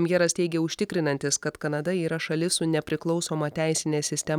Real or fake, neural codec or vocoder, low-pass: real; none; 19.8 kHz